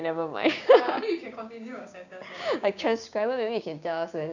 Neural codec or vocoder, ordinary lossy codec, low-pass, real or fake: codec, 16 kHz, 6 kbps, DAC; none; 7.2 kHz; fake